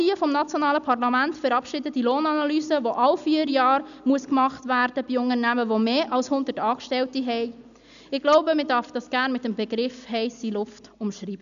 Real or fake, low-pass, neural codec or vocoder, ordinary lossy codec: real; 7.2 kHz; none; none